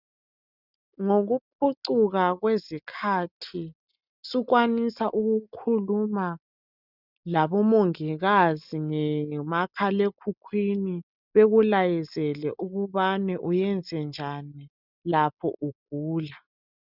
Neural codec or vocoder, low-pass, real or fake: none; 5.4 kHz; real